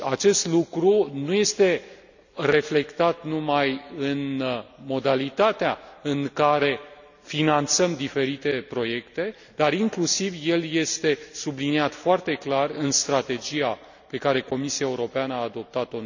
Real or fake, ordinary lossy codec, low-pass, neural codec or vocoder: real; none; 7.2 kHz; none